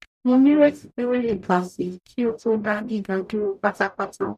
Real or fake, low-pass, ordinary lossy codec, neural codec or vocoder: fake; 14.4 kHz; none; codec, 44.1 kHz, 0.9 kbps, DAC